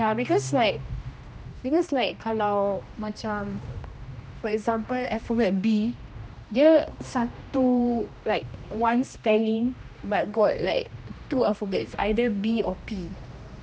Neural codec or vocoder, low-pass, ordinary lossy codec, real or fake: codec, 16 kHz, 1 kbps, X-Codec, HuBERT features, trained on general audio; none; none; fake